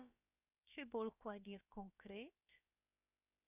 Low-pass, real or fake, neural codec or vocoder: 3.6 kHz; fake; codec, 16 kHz, about 1 kbps, DyCAST, with the encoder's durations